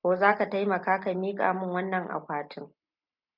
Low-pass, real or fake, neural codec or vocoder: 5.4 kHz; real; none